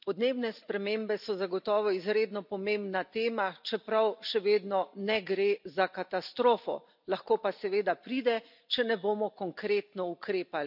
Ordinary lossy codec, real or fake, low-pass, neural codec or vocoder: none; real; 5.4 kHz; none